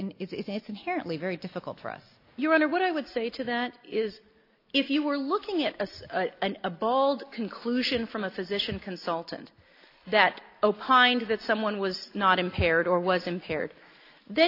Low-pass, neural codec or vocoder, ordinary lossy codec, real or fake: 5.4 kHz; none; AAC, 32 kbps; real